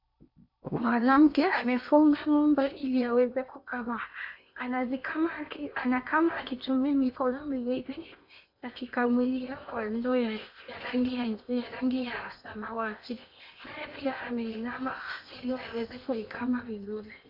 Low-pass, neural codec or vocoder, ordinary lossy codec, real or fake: 5.4 kHz; codec, 16 kHz in and 24 kHz out, 0.8 kbps, FocalCodec, streaming, 65536 codes; MP3, 48 kbps; fake